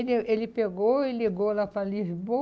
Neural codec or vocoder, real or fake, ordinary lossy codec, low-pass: none; real; none; none